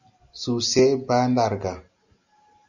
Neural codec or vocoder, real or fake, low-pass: none; real; 7.2 kHz